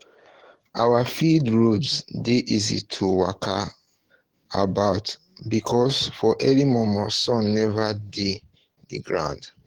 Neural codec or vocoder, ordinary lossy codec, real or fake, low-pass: codec, 44.1 kHz, 7.8 kbps, DAC; Opus, 16 kbps; fake; 19.8 kHz